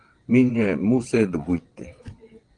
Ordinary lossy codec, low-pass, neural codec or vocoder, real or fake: Opus, 24 kbps; 9.9 kHz; vocoder, 22.05 kHz, 80 mel bands, WaveNeXt; fake